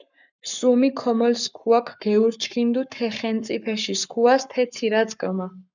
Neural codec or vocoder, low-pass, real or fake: codec, 44.1 kHz, 7.8 kbps, Pupu-Codec; 7.2 kHz; fake